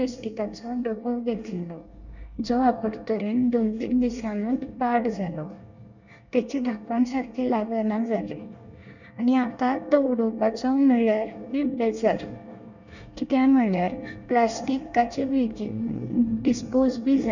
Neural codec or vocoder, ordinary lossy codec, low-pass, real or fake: codec, 24 kHz, 1 kbps, SNAC; none; 7.2 kHz; fake